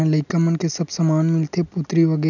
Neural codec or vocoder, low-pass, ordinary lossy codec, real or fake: none; 7.2 kHz; none; real